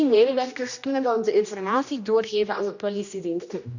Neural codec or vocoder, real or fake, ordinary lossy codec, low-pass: codec, 16 kHz, 1 kbps, X-Codec, HuBERT features, trained on general audio; fake; none; 7.2 kHz